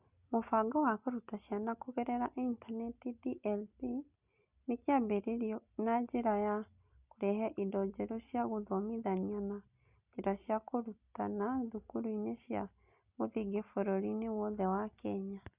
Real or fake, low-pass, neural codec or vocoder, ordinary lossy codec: real; 3.6 kHz; none; Opus, 64 kbps